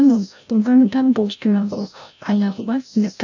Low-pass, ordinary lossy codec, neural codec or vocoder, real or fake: 7.2 kHz; none; codec, 16 kHz, 0.5 kbps, FreqCodec, larger model; fake